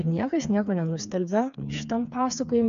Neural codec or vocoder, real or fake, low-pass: codec, 16 kHz, 4 kbps, FreqCodec, smaller model; fake; 7.2 kHz